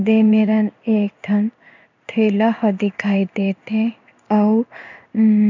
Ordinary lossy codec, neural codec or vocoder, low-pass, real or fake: none; codec, 16 kHz in and 24 kHz out, 1 kbps, XY-Tokenizer; 7.2 kHz; fake